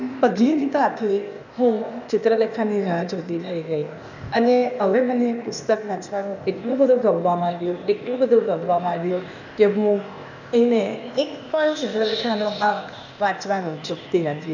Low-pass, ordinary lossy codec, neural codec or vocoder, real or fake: 7.2 kHz; none; codec, 16 kHz, 0.8 kbps, ZipCodec; fake